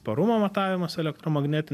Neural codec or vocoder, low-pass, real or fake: none; 14.4 kHz; real